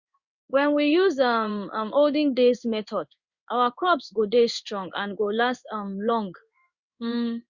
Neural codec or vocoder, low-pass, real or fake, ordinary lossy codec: codec, 16 kHz in and 24 kHz out, 1 kbps, XY-Tokenizer; 7.2 kHz; fake; Opus, 64 kbps